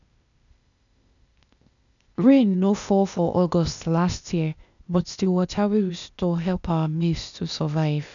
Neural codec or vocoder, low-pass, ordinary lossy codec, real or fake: codec, 16 kHz, 0.8 kbps, ZipCodec; 7.2 kHz; none; fake